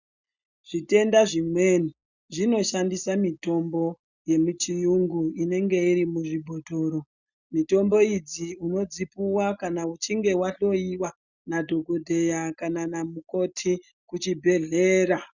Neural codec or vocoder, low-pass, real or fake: none; 7.2 kHz; real